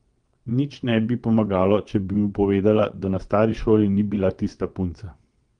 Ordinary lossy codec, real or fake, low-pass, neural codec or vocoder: Opus, 24 kbps; fake; 9.9 kHz; vocoder, 22.05 kHz, 80 mel bands, WaveNeXt